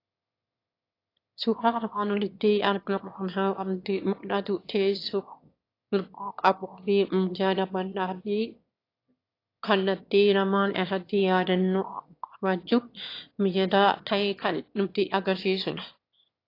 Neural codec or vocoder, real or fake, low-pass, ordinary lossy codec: autoencoder, 22.05 kHz, a latent of 192 numbers a frame, VITS, trained on one speaker; fake; 5.4 kHz; AAC, 32 kbps